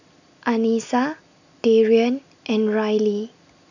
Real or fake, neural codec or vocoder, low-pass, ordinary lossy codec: real; none; 7.2 kHz; none